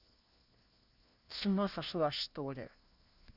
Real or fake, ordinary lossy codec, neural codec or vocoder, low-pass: fake; none; codec, 16 kHz, 1 kbps, FunCodec, trained on LibriTTS, 50 frames a second; 5.4 kHz